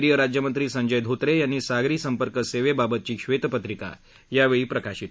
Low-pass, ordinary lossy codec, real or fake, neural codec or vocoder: none; none; real; none